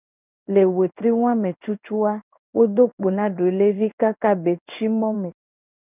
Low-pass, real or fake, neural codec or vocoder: 3.6 kHz; fake; codec, 16 kHz in and 24 kHz out, 1 kbps, XY-Tokenizer